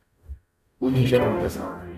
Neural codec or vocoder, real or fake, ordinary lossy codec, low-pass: codec, 44.1 kHz, 0.9 kbps, DAC; fake; none; 14.4 kHz